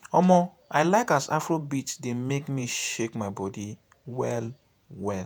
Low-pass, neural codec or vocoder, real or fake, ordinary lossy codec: none; vocoder, 48 kHz, 128 mel bands, Vocos; fake; none